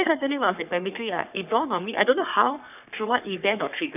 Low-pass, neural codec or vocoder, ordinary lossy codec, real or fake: 3.6 kHz; codec, 44.1 kHz, 3.4 kbps, Pupu-Codec; none; fake